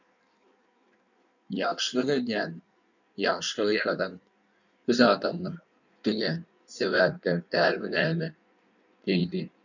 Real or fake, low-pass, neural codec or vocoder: fake; 7.2 kHz; codec, 16 kHz in and 24 kHz out, 1.1 kbps, FireRedTTS-2 codec